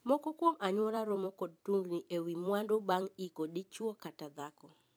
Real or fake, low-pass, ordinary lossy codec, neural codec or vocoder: fake; none; none; vocoder, 44.1 kHz, 128 mel bands every 256 samples, BigVGAN v2